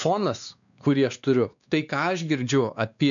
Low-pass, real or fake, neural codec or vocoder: 7.2 kHz; fake; codec, 16 kHz, 2 kbps, X-Codec, WavLM features, trained on Multilingual LibriSpeech